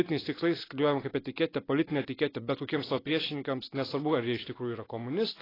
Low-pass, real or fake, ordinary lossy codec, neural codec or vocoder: 5.4 kHz; real; AAC, 24 kbps; none